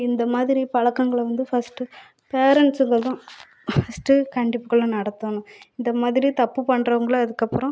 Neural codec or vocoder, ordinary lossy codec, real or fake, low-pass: none; none; real; none